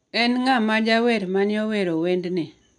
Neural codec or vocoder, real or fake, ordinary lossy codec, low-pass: none; real; none; 10.8 kHz